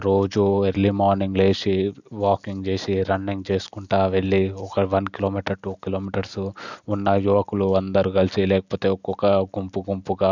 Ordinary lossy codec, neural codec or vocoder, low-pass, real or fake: none; none; 7.2 kHz; real